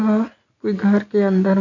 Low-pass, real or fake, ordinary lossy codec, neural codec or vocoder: 7.2 kHz; real; none; none